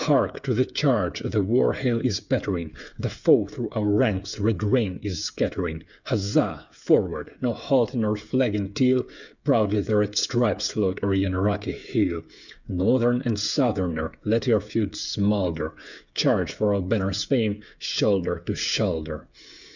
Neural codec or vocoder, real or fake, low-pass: codec, 16 kHz, 8 kbps, FreqCodec, smaller model; fake; 7.2 kHz